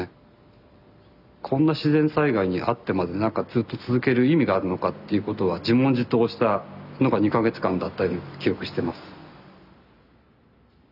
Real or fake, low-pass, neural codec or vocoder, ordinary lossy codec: real; 5.4 kHz; none; none